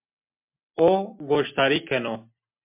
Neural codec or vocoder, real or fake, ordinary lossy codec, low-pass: none; real; MP3, 32 kbps; 3.6 kHz